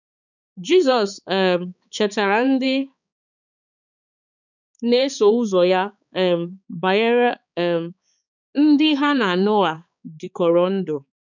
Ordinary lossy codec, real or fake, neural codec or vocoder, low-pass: none; fake; codec, 16 kHz, 4 kbps, X-Codec, HuBERT features, trained on balanced general audio; 7.2 kHz